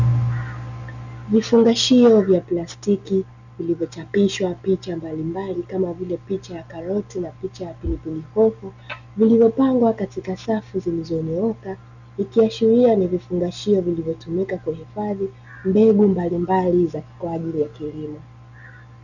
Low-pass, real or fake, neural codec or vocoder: 7.2 kHz; real; none